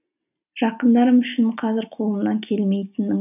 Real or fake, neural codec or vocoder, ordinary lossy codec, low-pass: real; none; none; 3.6 kHz